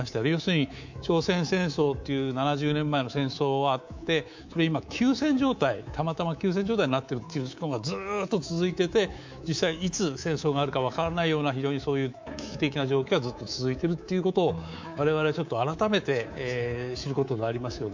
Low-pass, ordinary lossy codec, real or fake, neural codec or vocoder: 7.2 kHz; MP3, 64 kbps; fake; codec, 24 kHz, 3.1 kbps, DualCodec